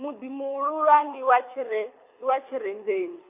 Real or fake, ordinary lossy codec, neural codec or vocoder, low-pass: fake; MP3, 24 kbps; codec, 24 kHz, 6 kbps, HILCodec; 3.6 kHz